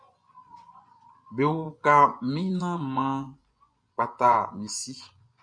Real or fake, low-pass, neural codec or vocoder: fake; 9.9 kHz; vocoder, 24 kHz, 100 mel bands, Vocos